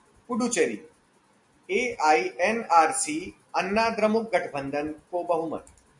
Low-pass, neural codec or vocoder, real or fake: 10.8 kHz; none; real